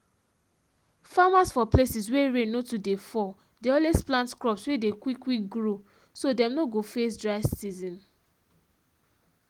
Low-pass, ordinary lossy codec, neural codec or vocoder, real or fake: 19.8 kHz; Opus, 24 kbps; none; real